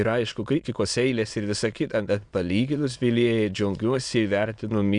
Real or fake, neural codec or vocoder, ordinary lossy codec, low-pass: fake; autoencoder, 22.05 kHz, a latent of 192 numbers a frame, VITS, trained on many speakers; Opus, 64 kbps; 9.9 kHz